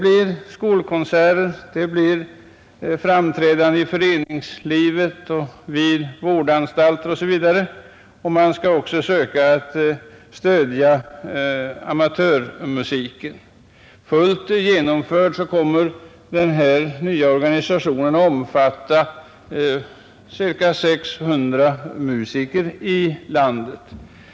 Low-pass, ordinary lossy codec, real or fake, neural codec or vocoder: none; none; real; none